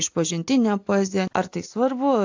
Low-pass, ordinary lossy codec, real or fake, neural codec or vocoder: 7.2 kHz; AAC, 48 kbps; real; none